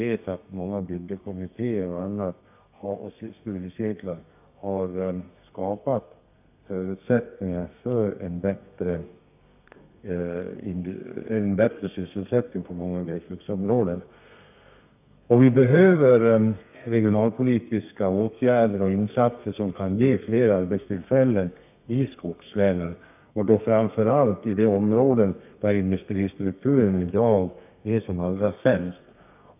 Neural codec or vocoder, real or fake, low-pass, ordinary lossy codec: codec, 32 kHz, 1.9 kbps, SNAC; fake; 3.6 kHz; none